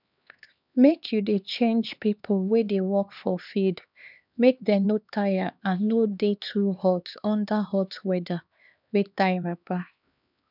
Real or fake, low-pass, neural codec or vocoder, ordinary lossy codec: fake; 5.4 kHz; codec, 16 kHz, 2 kbps, X-Codec, HuBERT features, trained on LibriSpeech; none